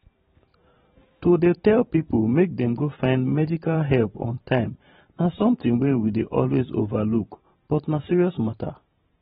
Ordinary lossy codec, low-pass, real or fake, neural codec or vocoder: AAC, 16 kbps; 19.8 kHz; fake; vocoder, 44.1 kHz, 128 mel bands every 512 samples, BigVGAN v2